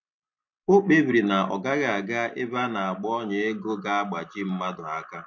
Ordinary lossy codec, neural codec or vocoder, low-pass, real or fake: MP3, 48 kbps; none; 7.2 kHz; real